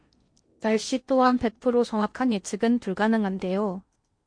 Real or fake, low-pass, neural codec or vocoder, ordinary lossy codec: fake; 9.9 kHz; codec, 16 kHz in and 24 kHz out, 0.8 kbps, FocalCodec, streaming, 65536 codes; MP3, 48 kbps